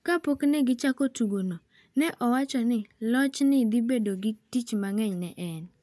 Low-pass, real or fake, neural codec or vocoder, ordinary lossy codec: none; real; none; none